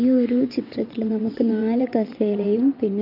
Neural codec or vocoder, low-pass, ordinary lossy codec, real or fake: vocoder, 22.05 kHz, 80 mel bands, WaveNeXt; 5.4 kHz; none; fake